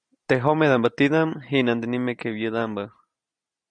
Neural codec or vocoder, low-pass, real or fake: none; 9.9 kHz; real